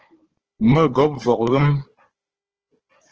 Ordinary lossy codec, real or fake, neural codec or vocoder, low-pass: Opus, 16 kbps; fake; codec, 16 kHz, 4 kbps, FunCodec, trained on Chinese and English, 50 frames a second; 7.2 kHz